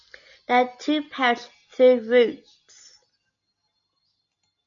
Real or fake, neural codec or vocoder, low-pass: real; none; 7.2 kHz